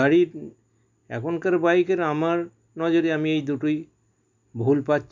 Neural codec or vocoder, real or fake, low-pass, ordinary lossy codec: none; real; 7.2 kHz; none